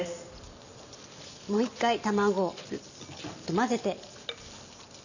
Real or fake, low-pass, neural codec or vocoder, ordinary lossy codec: real; 7.2 kHz; none; none